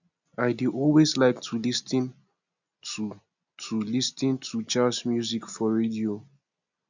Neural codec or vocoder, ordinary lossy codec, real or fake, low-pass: none; none; real; 7.2 kHz